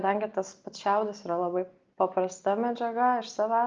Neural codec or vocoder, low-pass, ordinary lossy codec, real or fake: none; 7.2 kHz; Opus, 24 kbps; real